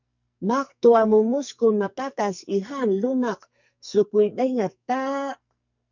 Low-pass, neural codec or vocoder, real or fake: 7.2 kHz; codec, 44.1 kHz, 2.6 kbps, SNAC; fake